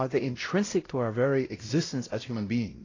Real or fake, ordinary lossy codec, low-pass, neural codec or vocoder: fake; AAC, 32 kbps; 7.2 kHz; codec, 16 kHz, 0.5 kbps, X-Codec, WavLM features, trained on Multilingual LibriSpeech